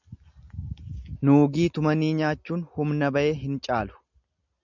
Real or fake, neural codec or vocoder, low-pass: real; none; 7.2 kHz